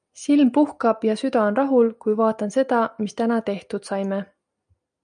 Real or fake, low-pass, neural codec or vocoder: real; 9.9 kHz; none